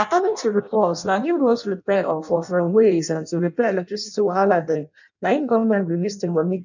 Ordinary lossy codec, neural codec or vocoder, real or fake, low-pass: none; codec, 16 kHz in and 24 kHz out, 0.6 kbps, FireRedTTS-2 codec; fake; 7.2 kHz